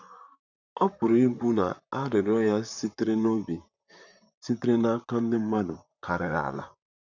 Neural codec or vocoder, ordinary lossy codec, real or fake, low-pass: vocoder, 44.1 kHz, 128 mel bands, Pupu-Vocoder; none; fake; 7.2 kHz